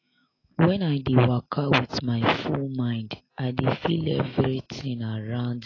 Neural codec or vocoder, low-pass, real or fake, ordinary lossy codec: autoencoder, 48 kHz, 128 numbers a frame, DAC-VAE, trained on Japanese speech; 7.2 kHz; fake; AAC, 32 kbps